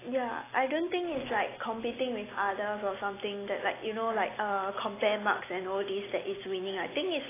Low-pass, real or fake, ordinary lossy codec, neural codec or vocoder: 3.6 kHz; real; AAC, 16 kbps; none